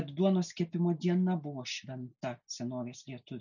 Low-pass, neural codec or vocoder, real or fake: 7.2 kHz; none; real